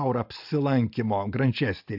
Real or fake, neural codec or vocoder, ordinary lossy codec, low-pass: real; none; AAC, 48 kbps; 5.4 kHz